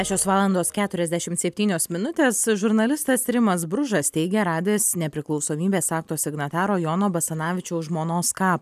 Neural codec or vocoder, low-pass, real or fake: none; 14.4 kHz; real